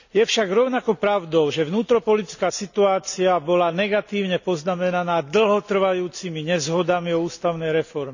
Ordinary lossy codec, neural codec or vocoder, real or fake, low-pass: none; none; real; 7.2 kHz